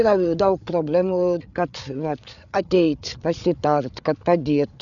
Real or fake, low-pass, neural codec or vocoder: fake; 7.2 kHz; codec, 16 kHz, 8 kbps, FreqCodec, larger model